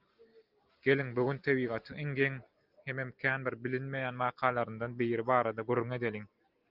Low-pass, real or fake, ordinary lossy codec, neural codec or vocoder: 5.4 kHz; real; Opus, 32 kbps; none